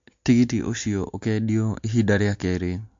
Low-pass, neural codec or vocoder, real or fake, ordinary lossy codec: 7.2 kHz; none; real; MP3, 48 kbps